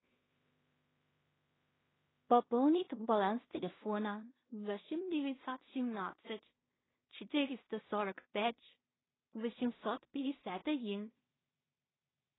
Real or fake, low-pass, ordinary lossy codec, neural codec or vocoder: fake; 7.2 kHz; AAC, 16 kbps; codec, 16 kHz in and 24 kHz out, 0.4 kbps, LongCat-Audio-Codec, two codebook decoder